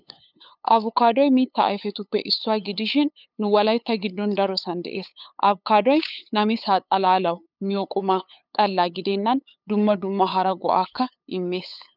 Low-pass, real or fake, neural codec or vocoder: 5.4 kHz; fake; codec, 16 kHz, 8 kbps, FunCodec, trained on LibriTTS, 25 frames a second